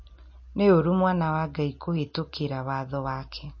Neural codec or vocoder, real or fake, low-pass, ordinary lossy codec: none; real; 7.2 kHz; MP3, 32 kbps